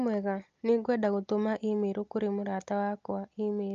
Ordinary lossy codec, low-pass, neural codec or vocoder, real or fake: Opus, 24 kbps; 7.2 kHz; none; real